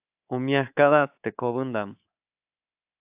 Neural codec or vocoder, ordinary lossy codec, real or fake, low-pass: codec, 24 kHz, 3.1 kbps, DualCodec; AAC, 32 kbps; fake; 3.6 kHz